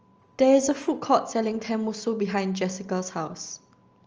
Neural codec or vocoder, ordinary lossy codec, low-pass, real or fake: none; Opus, 24 kbps; 7.2 kHz; real